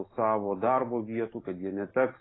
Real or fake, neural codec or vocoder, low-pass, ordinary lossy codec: real; none; 7.2 kHz; AAC, 16 kbps